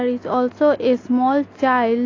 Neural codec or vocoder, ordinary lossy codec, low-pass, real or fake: none; AAC, 32 kbps; 7.2 kHz; real